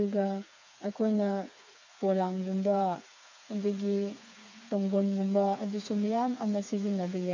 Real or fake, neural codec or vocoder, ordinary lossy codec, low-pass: fake; codec, 16 kHz, 4 kbps, FreqCodec, smaller model; MP3, 64 kbps; 7.2 kHz